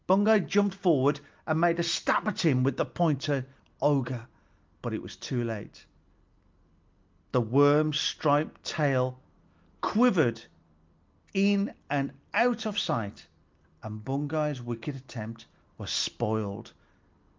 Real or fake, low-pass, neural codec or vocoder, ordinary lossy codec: real; 7.2 kHz; none; Opus, 32 kbps